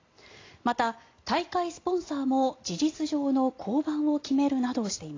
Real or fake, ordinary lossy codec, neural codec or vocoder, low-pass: real; AAC, 32 kbps; none; 7.2 kHz